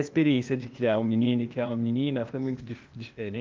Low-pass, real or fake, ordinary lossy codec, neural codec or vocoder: 7.2 kHz; fake; Opus, 24 kbps; codec, 16 kHz, 0.8 kbps, ZipCodec